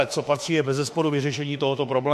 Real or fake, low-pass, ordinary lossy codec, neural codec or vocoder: fake; 14.4 kHz; MP3, 64 kbps; autoencoder, 48 kHz, 32 numbers a frame, DAC-VAE, trained on Japanese speech